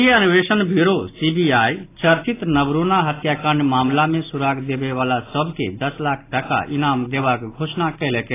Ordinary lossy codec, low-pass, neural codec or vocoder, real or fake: AAC, 24 kbps; 3.6 kHz; none; real